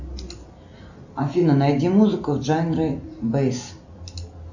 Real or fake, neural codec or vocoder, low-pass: real; none; 7.2 kHz